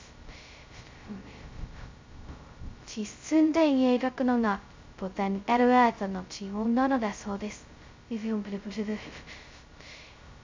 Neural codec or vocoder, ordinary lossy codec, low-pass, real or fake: codec, 16 kHz, 0.2 kbps, FocalCodec; MP3, 64 kbps; 7.2 kHz; fake